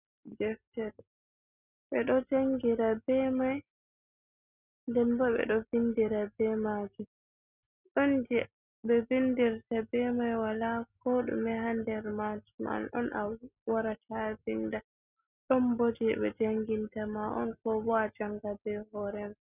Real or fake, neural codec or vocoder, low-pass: real; none; 3.6 kHz